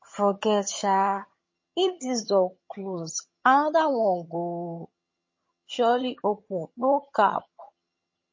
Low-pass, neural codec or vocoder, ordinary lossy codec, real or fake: 7.2 kHz; vocoder, 22.05 kHz, 80 mel bands, HiFi-GAN; MP3, 32 kbps; fake